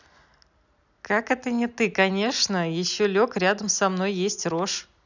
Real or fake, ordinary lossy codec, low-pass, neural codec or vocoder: real; none; 7.2 kHz; none